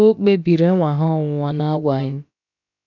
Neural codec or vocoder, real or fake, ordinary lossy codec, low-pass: codec, 16 kHz, about 1 kbps, DyCAST, with the encoder's durations; fake; none; 7.2 kHz